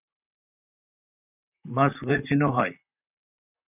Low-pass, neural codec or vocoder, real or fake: 3.6 kHz; vocoder, 44.1 kHz, 128 mel bands, Pupu-Vocoder; fake